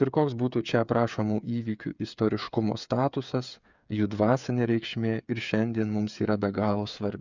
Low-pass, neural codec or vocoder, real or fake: 7.2 kHz; codec, 16 kHz, 8 kbps, FreqCodec, smaller model; fake